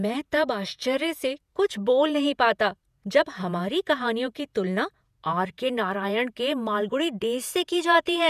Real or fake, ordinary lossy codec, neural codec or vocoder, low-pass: fake; none; vocoder, 48 kHz, 128 mel bands, Vocos; 14.4 kHz